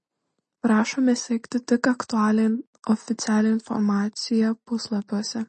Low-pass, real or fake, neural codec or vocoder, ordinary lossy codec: 10.8 kHz; real; none; MP3, 32 kbps